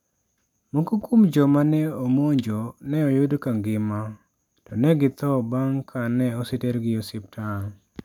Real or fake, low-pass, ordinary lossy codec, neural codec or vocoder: real; 19.8 kHz; none; none